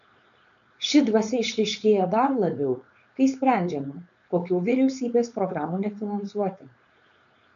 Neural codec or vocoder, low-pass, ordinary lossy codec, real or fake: codec, 16 kHz, 4.8 kbps, FACodec; 7.2 kHz; MP3, 96 kbps; fake